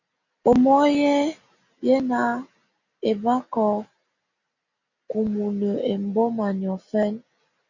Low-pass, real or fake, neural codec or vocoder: 7.2 kHz; real; none